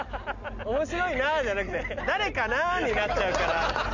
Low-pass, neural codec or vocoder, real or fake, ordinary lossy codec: 7.2 kHz; none; real; none